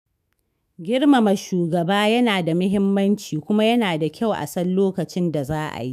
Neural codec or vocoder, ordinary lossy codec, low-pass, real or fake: autoencoder, 48 kHz, 128 numbers a frame, DAC-VAE, trained on Japanese speech; none; 14.4 kHz; fake